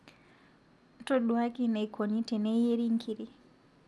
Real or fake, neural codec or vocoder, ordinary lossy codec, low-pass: real; none; none; none